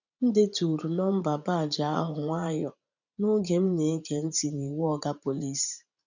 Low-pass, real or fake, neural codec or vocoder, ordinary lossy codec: 7.2 kHz; fake; vocoder, 44.1 kHz, 80 mel bands, Vocos; none